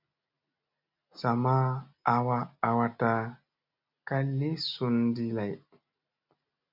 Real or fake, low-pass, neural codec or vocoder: real; 5.4 kHz; none